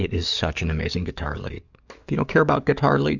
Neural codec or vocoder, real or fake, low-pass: codec, 44.1 kHz, 7.8 kbps, DAC; fake; 7.2 kHz